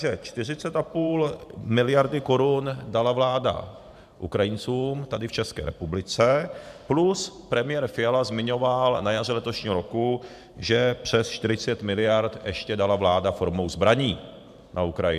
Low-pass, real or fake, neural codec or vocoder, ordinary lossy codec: 14.4 kHz; fake; vocoder, 44.1 kHz, 128 mel bands every 512 samples, BigVGAN v2; MP3, 96 kbps